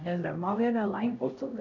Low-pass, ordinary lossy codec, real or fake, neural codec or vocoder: 7.2 kHz; none; fake; codec, 16 kHz, 0.5 kbps, X-Codec, HuBERT features, trained on LibriSpeech